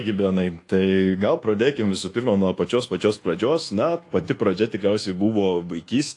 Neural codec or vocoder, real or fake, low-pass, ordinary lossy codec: codec, 24 kHz, 1.2 kbps, DualCodec; fake; 10.8 kHz; AAC, 48 kbps